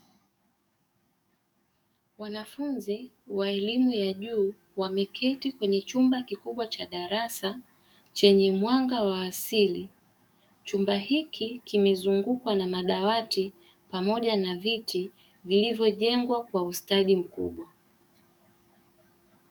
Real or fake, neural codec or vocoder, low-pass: fake; codec, 44.1 kHz, 7.8 kbps, DAC; 19.8 kHz